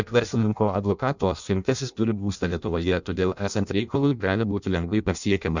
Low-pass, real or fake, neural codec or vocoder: 7.2 kHz; fake; codec, 16 kHz in and 24 kHz out, 0.6 kbps, FireRedTTS-2 codec